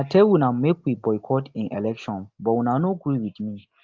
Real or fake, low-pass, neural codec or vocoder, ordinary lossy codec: real; 7.2 kHz; none; Opus, 32 kbps